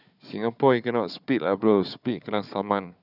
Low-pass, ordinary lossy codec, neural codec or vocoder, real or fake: 5.4 kHz; none; codec, 16 kHz, 4 kbps, FunCodec, trained on Chinese and English, 50 frames a second; fake